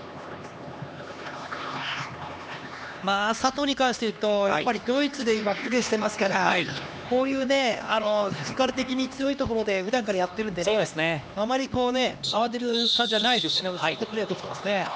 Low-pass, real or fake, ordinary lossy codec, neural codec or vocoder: none; fake; none; codec, 16 kHz, 2 kbps, X-Codec, HuBERT features, trained on LibriSpeech